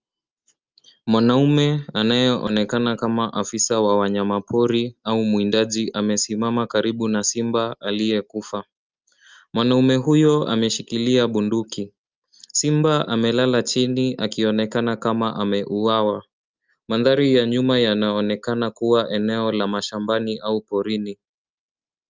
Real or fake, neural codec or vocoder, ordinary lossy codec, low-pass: real; none; Opus, 24 kbps; 7.2 kHz